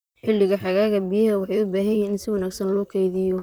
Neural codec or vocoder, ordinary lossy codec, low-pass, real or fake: vocoder, 44.1 kHz, 128 mel bands, Pupu-Vocoder; none; none; fake